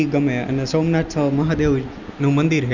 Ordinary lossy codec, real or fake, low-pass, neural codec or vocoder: Opus, 64 kbps; real; 7.2 kHz; none